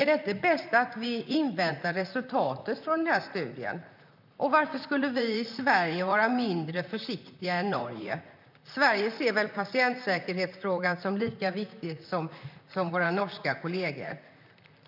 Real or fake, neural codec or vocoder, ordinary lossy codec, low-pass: fake; vocoder, 44.1 kHz, 128 mel bands, Pupu-Vocoder; none; 5.4 kHz